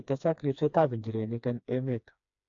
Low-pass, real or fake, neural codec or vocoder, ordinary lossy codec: 7.2 kHz; fake; codec, 16 kHz, 2 kbps, FreqCodec, smaller model; AAC, 48 kbps